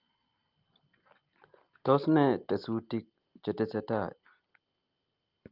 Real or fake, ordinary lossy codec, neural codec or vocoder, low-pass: real; Opus, 24 kbps; none; 5.4 kHz